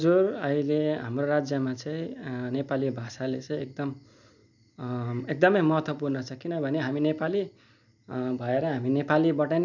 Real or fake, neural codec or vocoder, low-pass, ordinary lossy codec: real; none; 7.2 kHz; none